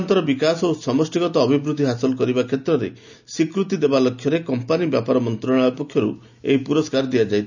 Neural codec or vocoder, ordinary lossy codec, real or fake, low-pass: none; none; real; 7.2 kHz